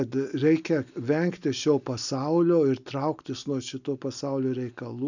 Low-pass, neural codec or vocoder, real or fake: 7.2 kHz; none; real